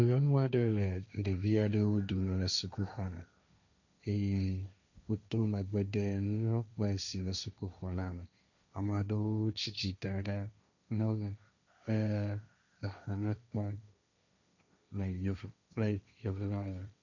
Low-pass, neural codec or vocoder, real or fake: 7.2 kHz; codec, 16 kHz, 1.1 kbps, Voila-Tokenizer; fake